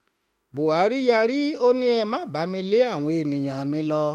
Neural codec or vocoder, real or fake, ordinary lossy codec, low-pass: autoencoder, 48 kHz, 32 numbers a frame, DAC-VAE, trained on Japanese speech; fake; MP3, 64 kbps; 19.8 kHz